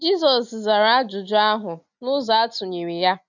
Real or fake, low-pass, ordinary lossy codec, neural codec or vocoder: real; 7.2 kHz; none; none